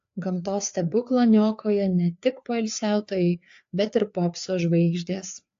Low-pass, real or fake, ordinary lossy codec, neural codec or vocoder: 7.2 kHz; fake; AAC, 48 kbps; codec, 16 kHz, 4 kbps, FreqCodec, larger model